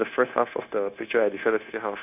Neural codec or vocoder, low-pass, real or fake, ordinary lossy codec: codec, 16 kHz, 0.9 kbps, LongCat-Audio-Codec; 3.6 kHz; fake; none